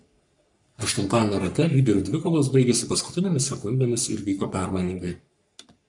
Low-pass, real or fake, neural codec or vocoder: 10.8 kHz; fake; codec, 44.1 kHz, 3.4 kbps, Pupu-Codec